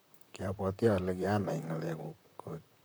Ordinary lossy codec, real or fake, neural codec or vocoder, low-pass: none; fake; vocoder, 44.1 kHz, 128 mel bands, Pupu-Vocoder; none